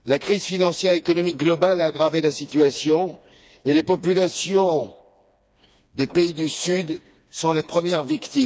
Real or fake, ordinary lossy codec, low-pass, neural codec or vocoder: fake; none; none; codec, 16 kHz, 2 kbps, FreqCodec, smaller model